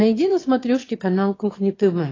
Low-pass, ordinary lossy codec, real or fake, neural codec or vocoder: 7.2 kHz; AAC, 32 kbps; fake; autoencoder, 22.05 kHz, a latent of 192 numbers a frame, VITS, trained on one speaker